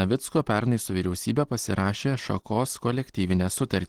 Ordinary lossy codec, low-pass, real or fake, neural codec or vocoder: Opus, 16 kbps; 19.8 kHz; real; none